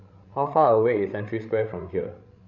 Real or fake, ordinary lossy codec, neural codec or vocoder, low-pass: fake; none; codec, 16 kHz, 16 kbps, FreqCodec, larger model; 7.2 kHz